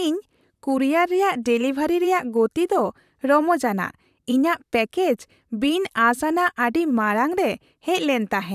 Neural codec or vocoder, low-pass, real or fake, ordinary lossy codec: vocoder, 44.1 kHz, 128 mel bands, Pupu-Vocoder; 14.4 kHz; fake; none